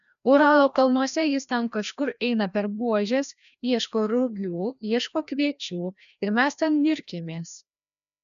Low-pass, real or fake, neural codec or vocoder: 7.2 kHz; fake; codec, 16 kHz, 1 kbps, FreqCodec, larger model